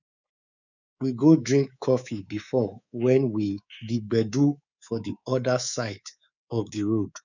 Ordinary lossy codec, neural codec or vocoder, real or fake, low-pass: none; codec, 24 kHz, 3.1 kbps, DualCodec; fake; 7.2 kHz